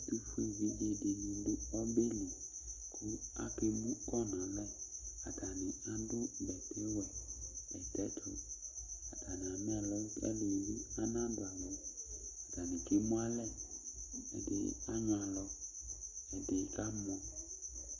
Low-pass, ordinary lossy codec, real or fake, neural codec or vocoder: 7.2 kHz; Opus, 64 kbps; real; none